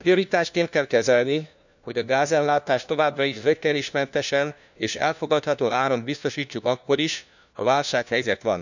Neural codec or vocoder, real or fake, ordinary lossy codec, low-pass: codec, 16 kHz, 1 kbps, FunCodec, trained on LibriTTS, 50 frames a second; fake; none; 7.2 kHz